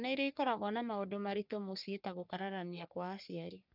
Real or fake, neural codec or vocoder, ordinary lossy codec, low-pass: fake; codec, 44.1 kHz, 3.4 kbps, Pupu-Codec; none; 5.4 kHz